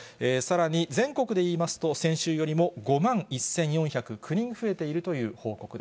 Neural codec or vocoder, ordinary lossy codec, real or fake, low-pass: none; none; real; none